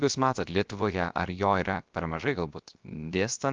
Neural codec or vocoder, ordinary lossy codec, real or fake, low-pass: codec, 16 kHz, 0.7 kbps, FocalCodec; Opus, 24 kbps; fake; 7.2 kHz